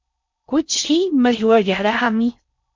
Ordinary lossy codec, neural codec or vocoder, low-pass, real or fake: MP3, 64 kbps; codec, 16 kHz in and 24 kHz out, 0.6 kbps, FocalCodec, streaming, 4096 codes; 7.2 kHz; fake